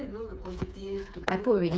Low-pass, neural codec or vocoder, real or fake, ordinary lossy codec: none; codec, 16 kHz, 4 kbps, FreqCodec, smaller model; fake; none